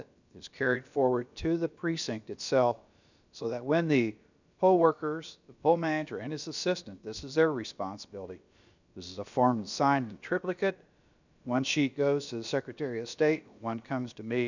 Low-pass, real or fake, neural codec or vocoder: 7.2 kHz; fake; codec, 16 kHz, about 1 kbps, DyCAST, with the encoder's durations